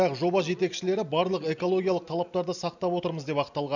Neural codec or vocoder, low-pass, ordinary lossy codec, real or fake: none; 7.2 kHz; none; real